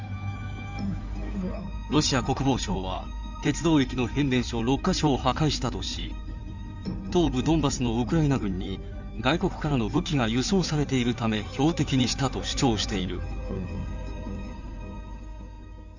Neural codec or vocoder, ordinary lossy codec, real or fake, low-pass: codec, 16 kHz in and 24 kHz out, 2.2 kbps, FireRedTTS-2 codec; none; fake; 7.2 kHz